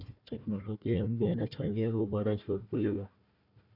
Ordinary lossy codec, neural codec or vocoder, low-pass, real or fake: none; codec, 16 kHz, 1 kbps, FunCodec, trained on Chinese and English, 50 frames a second; 5.4 kHz; fake